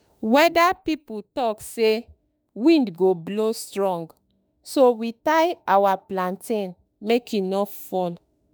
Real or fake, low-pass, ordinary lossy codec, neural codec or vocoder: fake; none; none; autoencoder, 48 kHz, 32 numbers a frame, DAC-VAE, trained on Japanese speech